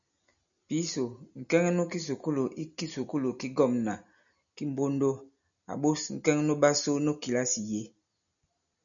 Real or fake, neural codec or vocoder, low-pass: real; none; 7.2 kHz